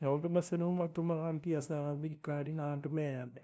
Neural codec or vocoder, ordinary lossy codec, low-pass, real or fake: codec, 16 kHz, 0.5 kbps, FunCodec, trained on LibriTTS, 25 frames a second; none; none; fake